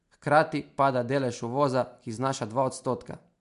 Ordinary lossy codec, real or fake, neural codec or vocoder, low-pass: MP3, 64 kbps; real; none; 10.8 kHz